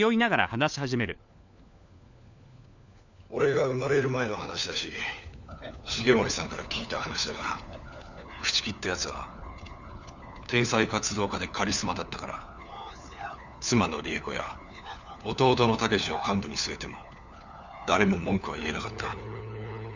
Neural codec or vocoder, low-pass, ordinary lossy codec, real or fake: codec, 16 kHz, 4 kbps, FunCodec, trained on LibriTTS, 50 frames a second; 7.2 kHz; none; fake